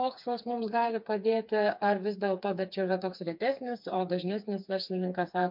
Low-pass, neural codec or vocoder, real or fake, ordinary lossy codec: 5.4 kHz; codec, 16 kHz, 4 kbps, FreqCodec, smaller model; fake; MP3, 48 kbps